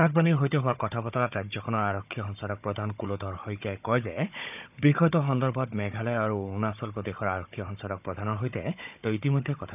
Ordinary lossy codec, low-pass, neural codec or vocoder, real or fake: none; 3.6 kHz; codec, 16 kHz, 16 kbps, FunCodec, trained on Chinese and English, 50 frames a second; fake